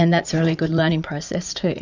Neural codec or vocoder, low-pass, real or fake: vocoder, 44.1 kHz, 80 mel bands, Vocos; 7.2 kHz; fake